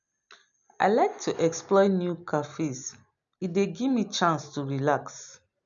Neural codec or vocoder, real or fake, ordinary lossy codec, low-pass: none; real; none; 7.2 kHz